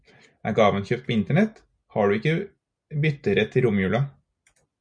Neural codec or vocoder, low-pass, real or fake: none; 9.9 kHz; real